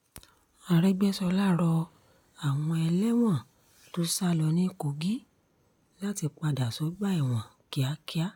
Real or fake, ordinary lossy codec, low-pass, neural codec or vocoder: real; none; 19.8 kHz; none